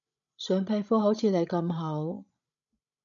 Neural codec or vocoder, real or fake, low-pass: codec, 16 kHz, 16 kbps, FreqCodec, larger model; fake; 7.2 kHz